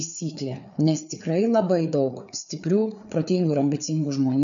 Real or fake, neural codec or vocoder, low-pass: fake; codec, 16 kHz, 4 kbps, FreqCodec, larger model; 7.2 kHz